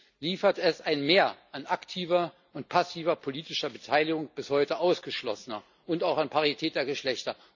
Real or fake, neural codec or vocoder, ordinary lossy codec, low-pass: real; none; none; 7.2 kHz